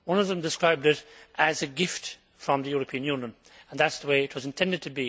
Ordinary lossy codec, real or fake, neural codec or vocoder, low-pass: none; real; none; none